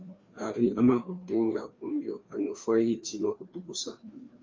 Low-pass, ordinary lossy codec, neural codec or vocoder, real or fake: 7.2 kHz; Opus, 32 kbps; codec, 16 kHz, 2 kbps, FreqCodec, larger model; fake